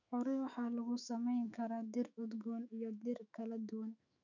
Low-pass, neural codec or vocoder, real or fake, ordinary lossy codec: 7.2 kHz; autoencoder, 48 kHz, 128 numbers a frame, DAC-VAE, trained on Japanese speech; fake; none